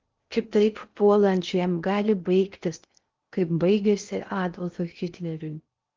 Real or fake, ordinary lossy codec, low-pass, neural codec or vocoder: fake; Opus, 32 kbps; 7.2 kHz; codec, 16 kHz in and 24 kHz out, 0.6 kbps, FocalCodec, streaming, 2048 codes